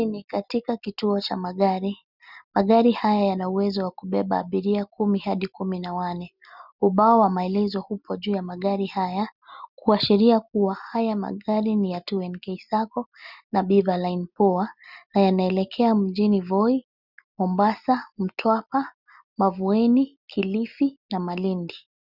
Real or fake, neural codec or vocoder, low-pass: real; none; 5.4 kHz